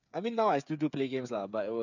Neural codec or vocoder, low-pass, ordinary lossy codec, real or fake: codec, 16 kHz, 8 kbps, FreqCodec, smaller model; 7.2 kHz; none; fake